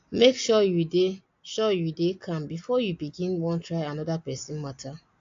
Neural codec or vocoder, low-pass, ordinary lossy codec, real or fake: none; 7.2 kHz; AAC, 48 kbps; real